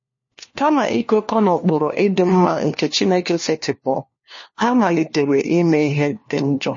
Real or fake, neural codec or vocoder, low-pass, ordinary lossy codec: fake; codec, 16 kHz, 1 kbps, FunCodec, trained on LibriTTS, 50 frames a second; 7.2 kHz; MP3, 32 kbps